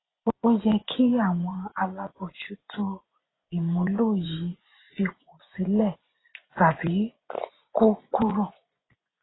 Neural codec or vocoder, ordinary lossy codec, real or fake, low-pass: vocoder, 24 kHz, 100 mel bands, Vocos; AAC, 16 kbps; fake; 7.2 kHz